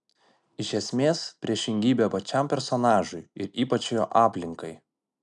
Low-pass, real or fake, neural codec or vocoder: 10.8 kHz; real; none